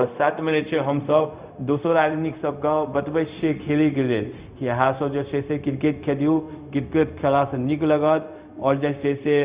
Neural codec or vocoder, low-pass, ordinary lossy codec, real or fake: codec, 16 kHz, 0.4 kbps, LongCat-Audio-Codec; 3.6 kHz; Opus, 64 kbps; fake